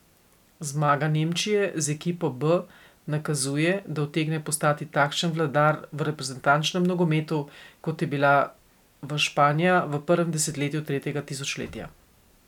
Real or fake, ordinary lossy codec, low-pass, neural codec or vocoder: real; none; 19.8 kHz; none